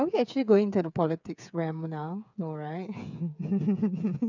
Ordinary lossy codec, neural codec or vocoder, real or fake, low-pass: none; codec, 16 kHz, 8 kbps, FreqCodec, smaller model; fake; 7.2 kHz